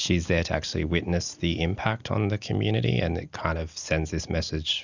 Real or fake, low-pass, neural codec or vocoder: real; 7.2 kHz; none